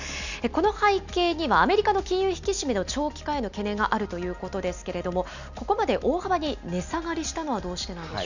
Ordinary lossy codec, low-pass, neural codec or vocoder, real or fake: none; 7.2 kHz; none; real